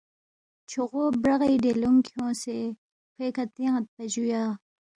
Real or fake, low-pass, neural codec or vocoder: real; 9.9 kHz; none